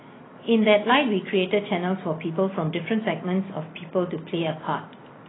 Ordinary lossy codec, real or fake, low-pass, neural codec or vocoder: AAC, 16 kbps; real; 7.2 kHz; none